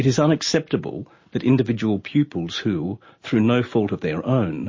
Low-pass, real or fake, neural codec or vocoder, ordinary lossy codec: 7.2 kHz; real; none; MP3, 32 kbps